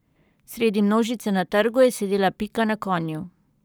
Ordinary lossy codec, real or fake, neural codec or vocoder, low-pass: none; fake; codec, 44.1 kHz, 7.8 kbps, Pupu-Codec; none